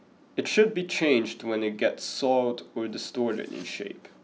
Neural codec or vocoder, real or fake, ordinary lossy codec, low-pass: none; real; none; none